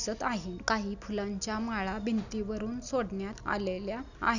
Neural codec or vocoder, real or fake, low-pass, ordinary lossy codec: none; real; 7.2 kHz; none